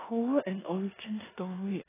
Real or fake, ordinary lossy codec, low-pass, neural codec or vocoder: fake; AAC, 16 kbps; 3.6 kHz; codec, 16 kHz in and 24 kHz out, 0.9 kbps, LongCat-Audio-Codec, four codebook decoder